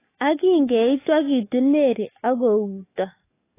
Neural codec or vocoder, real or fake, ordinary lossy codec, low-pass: codec, 44.1 kHz, 7.8 kbps, DAC; fake; AAC, 24 kbps; 3.6 kHz